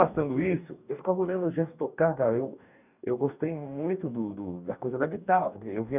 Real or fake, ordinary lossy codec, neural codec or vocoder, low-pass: fake; none; codec, 44.1 kHz, 2.6 kbps, DAC; 3.6 kHz